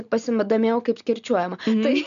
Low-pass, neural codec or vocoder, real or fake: 7.2 kHz; none; real